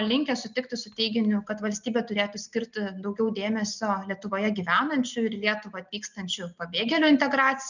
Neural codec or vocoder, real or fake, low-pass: none; real; 7.2 kHz